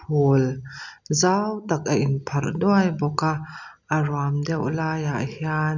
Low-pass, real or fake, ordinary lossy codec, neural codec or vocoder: 7.2 kHz; real; none; none